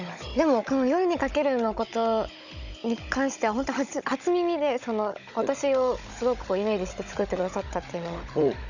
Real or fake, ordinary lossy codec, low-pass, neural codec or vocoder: fake; none; 7.2 kHz; codec, 16 kHz, 16 kbps, FunCodec, trained on Chinese and English, 50 frames a second